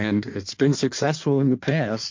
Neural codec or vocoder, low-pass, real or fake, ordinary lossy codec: codec, 16 kHz in and 24 kHz out, 1.1 kbps, FireRedTTS-2 codec; 7.2 kHz; fake; MP3, 48 kbps